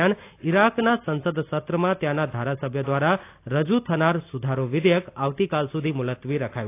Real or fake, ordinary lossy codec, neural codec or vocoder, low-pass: real; AAC, 24 kbps; none; 3.6 kHz